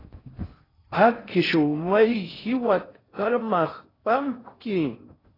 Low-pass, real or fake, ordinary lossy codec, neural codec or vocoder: 5.4 kHz; fake; AAC, 24 kbps; codec, 16 kHz in and 24 kHz out, 0.6 kbps, FocalCodec, streaming, 4096 codes